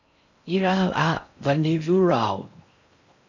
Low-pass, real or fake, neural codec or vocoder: 7.2 kHz; fake; codec, 16 kHz in and 24 kHz out, 0.6 kbps, FocalCodec, streaming, 4096 codes